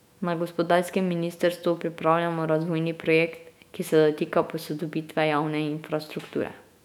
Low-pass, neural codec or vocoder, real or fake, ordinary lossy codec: 19.8 kHz; autoencoder, 48 kHz, 128 numbers a frame, DAC-VAE, trained on Japanese speech; fake; none